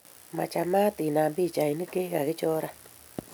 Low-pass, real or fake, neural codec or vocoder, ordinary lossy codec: none; real; none; none